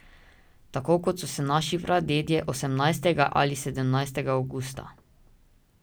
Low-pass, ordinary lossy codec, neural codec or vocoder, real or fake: none; none; none; real